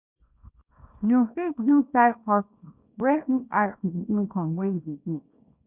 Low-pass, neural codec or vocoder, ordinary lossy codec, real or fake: 3.6 kHz; codec, 24 kHz, 0.9 kbps, WavTokenizer, small release; none; fake